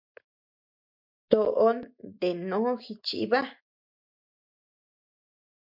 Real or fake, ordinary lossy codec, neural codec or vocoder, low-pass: real; MP3, 48 kbps; none; 5.4 kHz